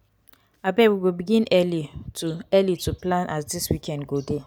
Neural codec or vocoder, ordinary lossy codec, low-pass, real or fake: none; none; none; real